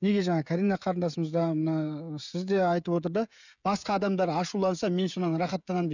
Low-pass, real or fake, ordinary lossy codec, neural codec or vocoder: 7.2 kHz; fake; none; codec, 16 kHz, 16 kbps, FreqCodec, smaller model